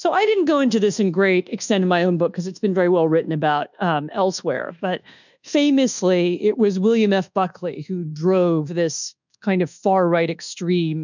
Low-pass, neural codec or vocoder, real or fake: 7.2 kHz; codec, 24 kHz, 1.2 kbps, DualCodec; fake